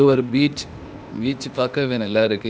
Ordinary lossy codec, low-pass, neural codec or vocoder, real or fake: none; none; codec, 16 kHz, 0.8 kbps, ZipCodec; fake